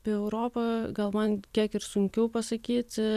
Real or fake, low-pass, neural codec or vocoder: fake; 14.4 kHz; vocoder, 44.1 kHz, 128 mel bands every 512 samples, BigVGAN v2